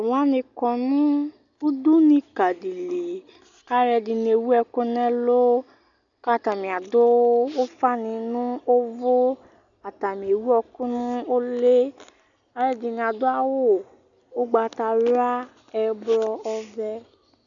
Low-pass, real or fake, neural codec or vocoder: 7.2 kHz; real; none